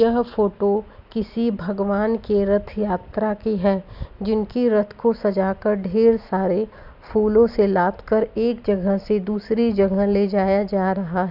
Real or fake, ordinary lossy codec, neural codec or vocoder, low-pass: real; none; none; 5.4 kHz